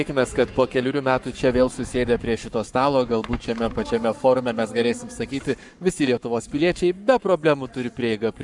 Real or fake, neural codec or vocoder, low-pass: fake; codec, 44.1 kHz, 7.8 kbps, Pupu-Codec; 10.8 kHz